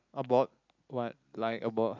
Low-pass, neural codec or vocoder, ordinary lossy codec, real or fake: 7.2 kHz; none; none; real